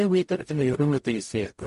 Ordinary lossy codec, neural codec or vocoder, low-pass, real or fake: MP3, 48 kbps; codec, 44.1 kHz, 0.9 kbps, DAC; 14.4 kHz; fake